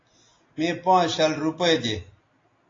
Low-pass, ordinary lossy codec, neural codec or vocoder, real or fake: 7.2 kHz; AAC, 32 kbps; none; real